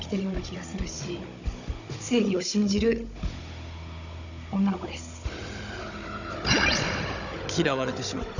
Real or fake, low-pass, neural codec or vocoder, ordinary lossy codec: fake; 7.2 kHz; codec, 16 kHz, 16 kbps, FunCodec, trained on Chinese and English, 50 frames a second; none